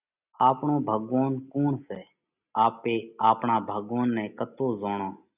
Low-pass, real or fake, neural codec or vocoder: 3.6 kHz; real; none